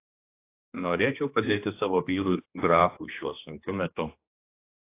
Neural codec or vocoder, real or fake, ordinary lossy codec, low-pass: codec, 16 kHz, 2 kbps, X-Codec, HuBERT features, trained on general audio; fake; AAC, 24 kbps; 3.6 kHz